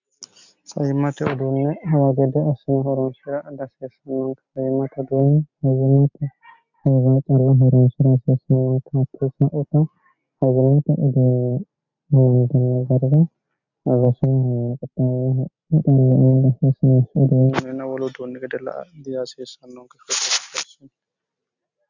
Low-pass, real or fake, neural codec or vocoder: 7.2 kHz; real; none